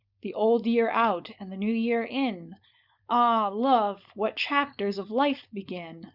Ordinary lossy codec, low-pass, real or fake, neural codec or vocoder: Opus, 64 kbps; 5.4 kHz; fake; codec, 16 kHz, 4.8 kbps, FACodec